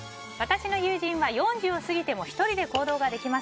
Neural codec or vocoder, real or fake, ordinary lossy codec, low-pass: none; real; none; none